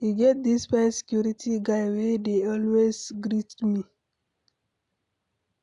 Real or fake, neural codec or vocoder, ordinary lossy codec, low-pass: real; none; none; 10.8 kHz